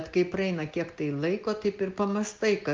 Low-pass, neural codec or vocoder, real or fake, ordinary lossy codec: 7.2 kHz; none; real; Opus, 24 kbps